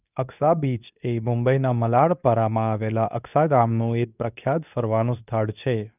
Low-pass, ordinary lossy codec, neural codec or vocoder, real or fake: 3.6 kHz; none; codec, 24 kHz, 0.9 kbps, WavTokenizer, medium speech release version 2; fake